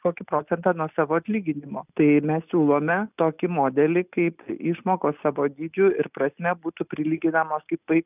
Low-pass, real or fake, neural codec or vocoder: 3.6 kHz; real; none